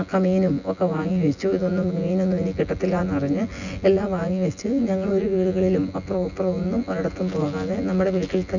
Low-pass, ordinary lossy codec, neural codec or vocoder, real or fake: 7.2 kHz; none; vocoder, 24 kHz, 100 mel bands, Vocos; fake